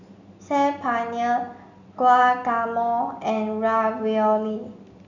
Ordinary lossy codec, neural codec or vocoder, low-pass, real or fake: none; none; 7.2 kHz; real